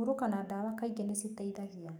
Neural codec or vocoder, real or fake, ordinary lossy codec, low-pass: codec, 44.1 kHz, 7.8 kbps, DAC; fake; none; none